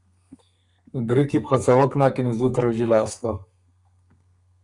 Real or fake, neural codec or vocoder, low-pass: fake; codec, 32 kHz, 1.9 kbps, SNAC; 10.8 kHz